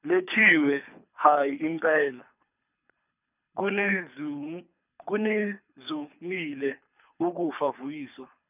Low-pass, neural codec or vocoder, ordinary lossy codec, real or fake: 3.6 kHz; codec, 24 kHz, 3 kbps, HILCodec; none; fake